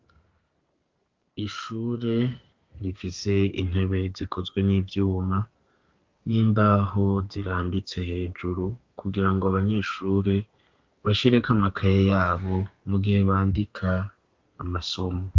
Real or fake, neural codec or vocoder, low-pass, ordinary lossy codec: fake; codec, 32 kHz, 1.9 kbps, SNAC; 7.2 kHz; Opus, 24 kbps